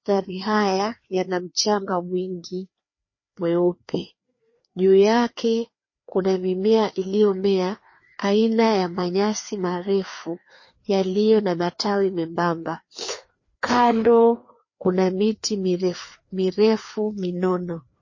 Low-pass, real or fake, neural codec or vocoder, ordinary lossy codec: 7.2 kHz; fake; codec, 16 kHz, 2 kbps, FreqCodec, larger model; MP3, 32 kbps